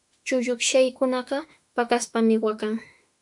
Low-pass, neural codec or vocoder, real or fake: 10.8 kHz; autoencoder, 48 kHz, 32 numbers a frame, DAC-VAE, trained on Japanese speech; fake